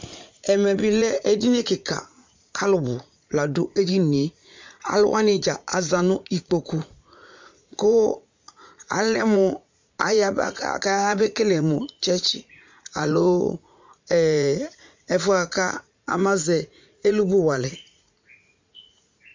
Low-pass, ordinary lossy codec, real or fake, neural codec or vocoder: 7.2 kHz; MP3, 64 kbps; fake; vocoder, 44.1 kHz, 80 mel bands, Vocos